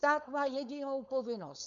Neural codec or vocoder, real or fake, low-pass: codec, 16 kHz, 4.8 kbps, FACodec; fake; 7.2 kHz